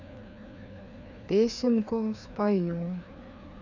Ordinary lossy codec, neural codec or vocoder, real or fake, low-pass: none; codec, 16 kHz, 2 kbps, FreqCodec, larger model; fake; 7.2 kHz